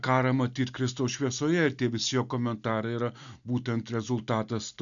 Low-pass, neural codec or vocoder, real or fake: 7.2 kHz; none; real